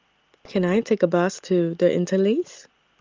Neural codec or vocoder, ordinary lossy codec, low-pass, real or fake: none; Opus, 24 kbps; 7.2 kHz; real